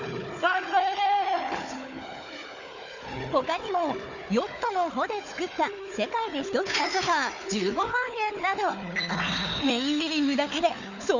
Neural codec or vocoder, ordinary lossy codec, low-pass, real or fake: codec, 16 kHz, 4 kbps, FunCodec, trained on Chinese and English, 50 frames a second; none; 7.2 kHz; fake